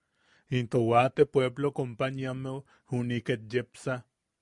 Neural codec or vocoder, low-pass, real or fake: none; 10.8 kHz; real